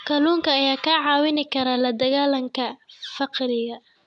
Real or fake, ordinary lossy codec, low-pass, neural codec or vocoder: real; none; 10.8 kHz; none